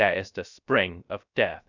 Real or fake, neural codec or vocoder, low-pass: fake; codec, 16 kHz, 0.3 kbps, FocalCodec; 7.2 kHz